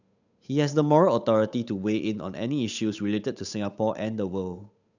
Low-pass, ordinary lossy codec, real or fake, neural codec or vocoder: 7.2 kHz; none; fake; codec, 16 kHz, 8 kbps, FunCodec, trained on Chinese and English, 25 frames a second